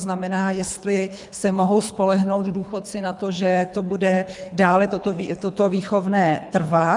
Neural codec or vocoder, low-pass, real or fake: codec, 24 kHz, 3 kbps, HILCodec; 10.8 kHz; fake